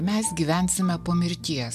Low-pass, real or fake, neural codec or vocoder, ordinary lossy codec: 14.4 kHz; real; none; MP3, 96 kbps